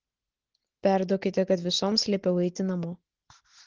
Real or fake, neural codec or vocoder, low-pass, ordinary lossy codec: real; none; 7.2 kHz; Opus, 16 kbps